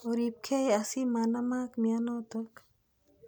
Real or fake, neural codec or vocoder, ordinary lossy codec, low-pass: real; none; none; none